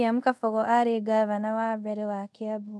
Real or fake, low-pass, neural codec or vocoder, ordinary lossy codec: fake; none; codec, 24 kHz, 0.5 kbps, DualCodec; none